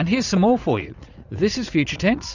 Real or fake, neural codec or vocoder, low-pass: fake; vocoder, 44.1 kHz, 80 mel bands, Vocos; 7.2 kHz